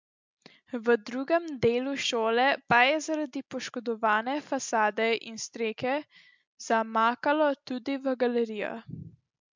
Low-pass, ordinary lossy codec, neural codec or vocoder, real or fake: 7.2 kHz; MP3, 64 kbps; none; real